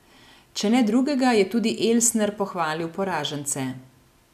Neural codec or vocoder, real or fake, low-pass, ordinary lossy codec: none; real; 14.4 kHz; none